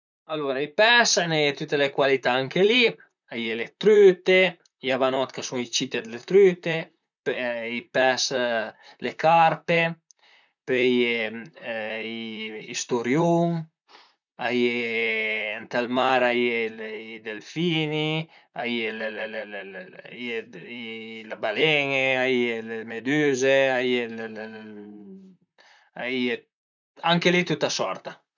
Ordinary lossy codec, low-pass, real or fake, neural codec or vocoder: none; 7.2 kHz; fake; vocoder, 44.1 kHz, 128 mel bands, Pupu-Vocoder